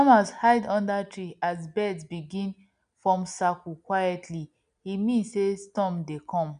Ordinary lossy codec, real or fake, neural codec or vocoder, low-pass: none; real; none; 9.9 kHz